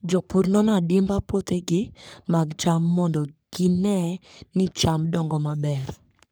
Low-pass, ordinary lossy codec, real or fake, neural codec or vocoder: none; none; fake; codec, 44.1 kHz, 3.4 kbps, Pupu-Codec